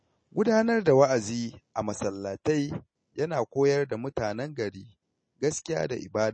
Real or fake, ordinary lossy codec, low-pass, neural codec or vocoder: real; MP3, 32 kbps; 9.9 kHz; none